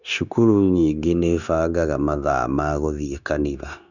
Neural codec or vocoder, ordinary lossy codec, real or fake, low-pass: codec, 16 kHz, 0.9 kbps, LongCat-Audio-Codec; none; fake; 7.2 kHz